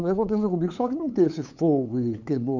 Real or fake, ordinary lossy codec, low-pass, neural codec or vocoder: fake; none; 7.2 kHz; codec, 16 kHz, 8 kbps, FunCodec, trained on LibriTTS, 25 frames a second